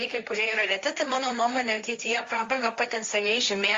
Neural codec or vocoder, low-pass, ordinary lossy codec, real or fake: codec, 16 kHz, 1.1 kbps, Voila-Tokenizer; 7.2 kHz; Opus, 32 kbps; fake